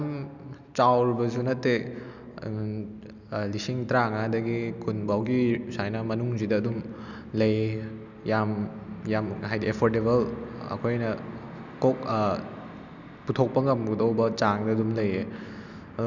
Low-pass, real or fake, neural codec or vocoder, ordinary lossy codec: 7.2 kHz; real; none; none